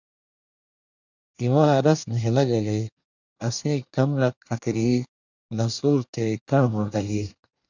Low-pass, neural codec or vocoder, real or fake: 7.2 kHz; codec, 24 kHz, 1 kbps, SNAC; fake